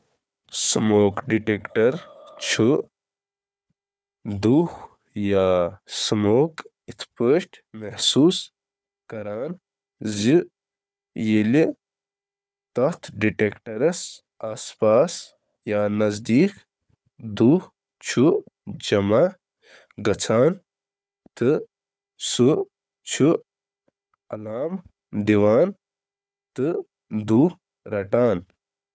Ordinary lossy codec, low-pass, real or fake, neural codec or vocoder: none; none; fake; codec, 16 kHz, 4 kbps, FunCodec, trained on Chinese and English, 50 frames a second